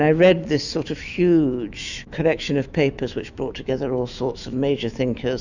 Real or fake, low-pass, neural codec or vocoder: fake; 7.2 kHz; autoencoder, 48 kHz, 128 numbers a frame, DAC-VAE, trained on Japanese speech